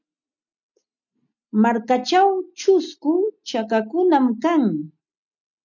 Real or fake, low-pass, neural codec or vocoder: real; 7.2 kHz; none